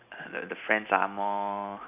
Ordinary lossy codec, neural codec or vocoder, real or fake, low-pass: none; none; real; 3.6 kHz